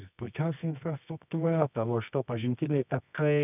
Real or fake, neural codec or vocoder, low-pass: fake; codec, 24 kHz, 0.9 kbps, WavTokenizer, medium music audio release; 3.6 kHz